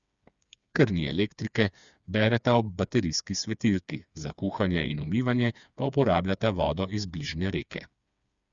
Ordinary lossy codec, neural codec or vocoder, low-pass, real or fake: Opus, 64 kbps; codec, 16 kHz, 4 kbps, FreqCodec, smaller model; 7.2 kHz; fake